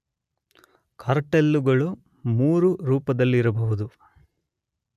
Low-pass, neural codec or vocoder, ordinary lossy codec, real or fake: 14.4 kHz; none; none; real